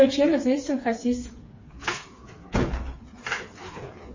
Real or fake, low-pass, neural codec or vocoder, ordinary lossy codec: fake; 7.2 kHz; codec, 16 kHz, 4 kbps, FreqCodec, smaller model; MP3, 32 kbps